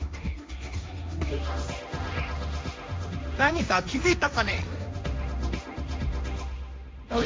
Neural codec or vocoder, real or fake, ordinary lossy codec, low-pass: codec, 16 kHz, 1.1 kbps, Voila-Tokenizer; fake; none; none